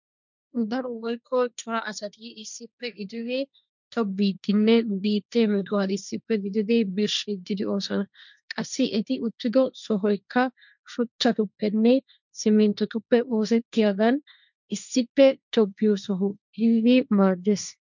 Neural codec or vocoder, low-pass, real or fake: codec, 16 kHz, 1.1 kbps, Voila-Tokenizer; 7.2 kHz; fake